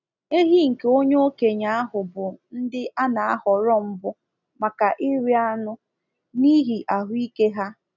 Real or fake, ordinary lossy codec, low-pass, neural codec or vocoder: real; none; 7.2 kHz; none